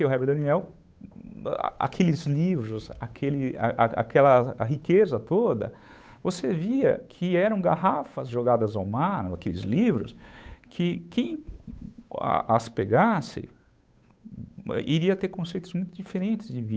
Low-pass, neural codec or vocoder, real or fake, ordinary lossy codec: none; codec, 16 kHz, 8 kbps, FunCodec, trained on Chinese and English, 25 frames a second; fake; none